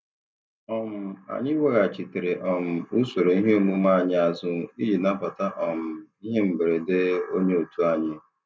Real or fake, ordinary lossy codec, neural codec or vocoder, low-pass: real; none; none; none